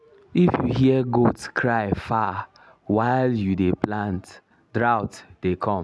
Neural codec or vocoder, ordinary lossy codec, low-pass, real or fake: none; none; none; real